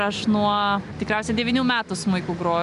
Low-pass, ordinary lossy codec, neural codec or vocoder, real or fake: 10.8 kHz; MP3, 96 kbps; none; real